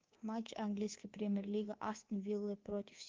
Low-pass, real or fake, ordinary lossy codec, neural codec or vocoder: 7.2 kHz; fake; Opus, 32 kbps; codec, 24 kHz, 3.1 kbps, DualCodec